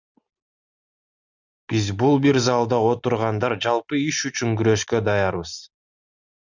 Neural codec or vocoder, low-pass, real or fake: none; 7.2 kHz; real